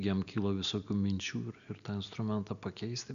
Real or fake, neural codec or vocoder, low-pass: real; none; 7.2 kHz